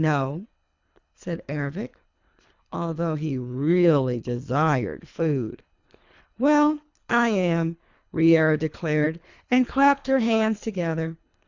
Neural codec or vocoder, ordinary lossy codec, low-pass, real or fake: codec, 24 kHz, 3 kbps, HILCodec; Opus, 64 kbps; 7.2 kHz; fake